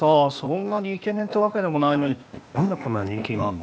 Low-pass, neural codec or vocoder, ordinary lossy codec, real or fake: none; codec, 16 kHz, 0.8 kbps, ZipCodec; none; fake